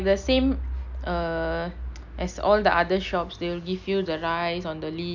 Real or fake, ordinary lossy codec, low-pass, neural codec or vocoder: real; none; 7.2 kHz; none